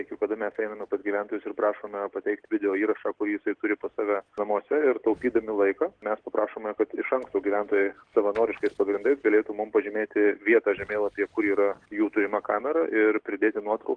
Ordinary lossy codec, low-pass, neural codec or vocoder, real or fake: Opus, 16 kbps; 9.9 kHz; none; real